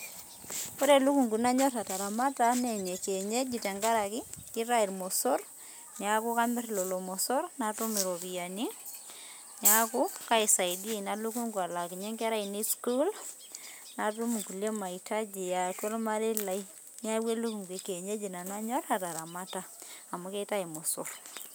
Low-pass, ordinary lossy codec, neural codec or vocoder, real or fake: none; none; none; real